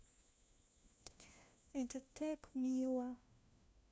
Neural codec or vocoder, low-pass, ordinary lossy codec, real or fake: codec, 16 kHz, 1 kbps, FunCodec, trained on LibriTTS, 50 frames a second; none; none; fake